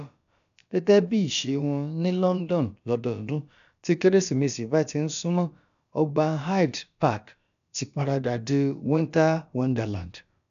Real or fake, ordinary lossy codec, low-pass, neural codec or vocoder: fake; none; 7.2 kHz; codec, 16 kHz, about 1 kbps, DyCAST, with the encoder's durations